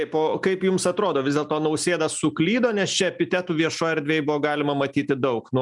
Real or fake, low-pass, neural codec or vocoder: real; 10.8 kHz; none